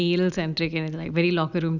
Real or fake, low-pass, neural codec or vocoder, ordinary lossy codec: real; 7.2 kHz; none; none